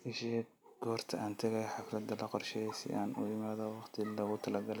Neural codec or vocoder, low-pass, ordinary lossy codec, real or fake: none; none; none; real